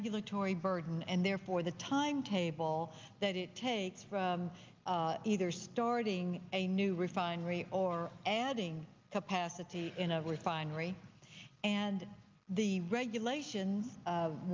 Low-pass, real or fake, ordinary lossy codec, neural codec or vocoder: 7.2 kHz; real; Opus, 24 kbps; none